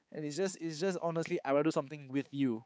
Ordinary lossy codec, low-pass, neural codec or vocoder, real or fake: none; none; codec, 16 kHz, 4 kbps, X-Codec, HuBERT features, trained on balanced general audio; fake